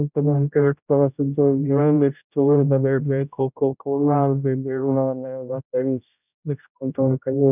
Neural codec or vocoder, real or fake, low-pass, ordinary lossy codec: codec, 16 kHz, 0.5 kbps, X-Codec, HuBERT features, trained on general audio; fake; 3.6 kHz; none